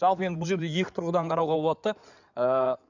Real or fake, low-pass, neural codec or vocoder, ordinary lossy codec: fake; 7.2 kHz; codec, 16 kHz in and 24 kHz out, 2.2 kbps, FireRedTTS-2 codec; none